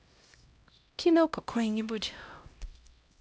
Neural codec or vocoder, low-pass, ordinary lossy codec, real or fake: codec, 16 kHz, 0.5 kbps, X-Codec, HuBERT features, trained on LibriSpeech; none; none; fake